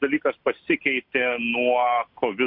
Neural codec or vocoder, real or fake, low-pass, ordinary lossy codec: none; real; 5.4 kHz; MP3, 48 kbps